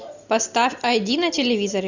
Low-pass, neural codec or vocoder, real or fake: 7.2 kHz; none; real